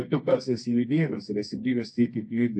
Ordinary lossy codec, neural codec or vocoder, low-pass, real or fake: AAC, 48 kbps; codec, 24 kHz, 0.9 kbps, WavTokenizer, medium music audio release; 10.8 kHz; fake